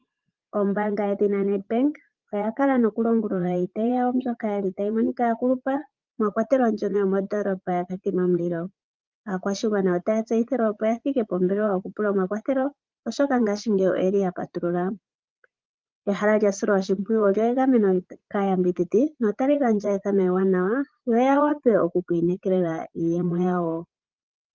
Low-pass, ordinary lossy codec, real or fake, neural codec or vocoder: 7.2 kHz; Opus, 32 kbps; fake; vocoder, 44.1 kHz, 80 mel bands, Vocos